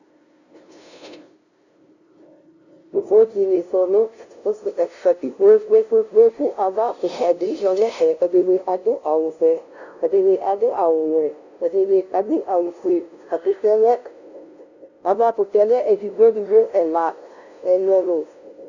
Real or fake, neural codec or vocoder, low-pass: fake; codec, 16 kHz, 0.5 kbps, FunCodec, trained on LibriTTS, 25 frames a second; 7.2 kHz